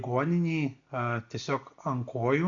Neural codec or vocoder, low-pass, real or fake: none; 7.2 kHz; real